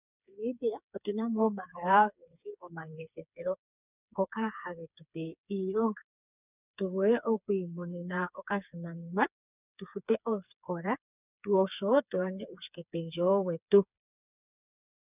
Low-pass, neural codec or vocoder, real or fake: 3.6 kHz; codec, 16 kHz, 4 kbps, FreqCodec, smaller model; fake